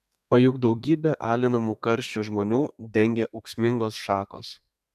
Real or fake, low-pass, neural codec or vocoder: fake; 14.4 kHz; codec, 32 kHz, 1.9 kbps, SNAC